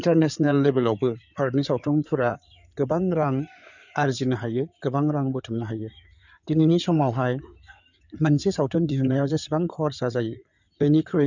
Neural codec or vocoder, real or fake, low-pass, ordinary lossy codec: codec, 16 kHz in and 24 kHz out, 2.2 kbps, FireRedTTS-2 codec; fake; 7.2 kHz; none